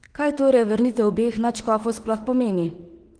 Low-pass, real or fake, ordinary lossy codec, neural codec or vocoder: 9.9 kHz; fake; Opus, 16 kbps; autoencoder, 48 kHz, 32 numbers a frame, DAC-VAE, trained on Japanese speech